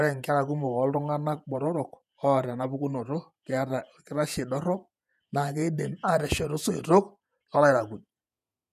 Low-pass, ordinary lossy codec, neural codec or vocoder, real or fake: 14.4 kHz; none; vocoder, 48 kHz, 128 mel bands, Vocos; fake